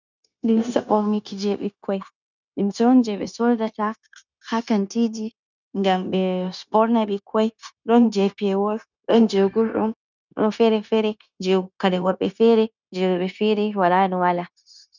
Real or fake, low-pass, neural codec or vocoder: fake; 7.2 kHz; codec, 16 kHz, 0.9 kbps, LongCat-Audio-Codec